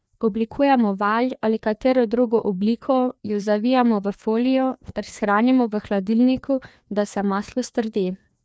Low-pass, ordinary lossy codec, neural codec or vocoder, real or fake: none; none; codec, 16 kHz, 2 kbps, FreqCodec, larger model; fake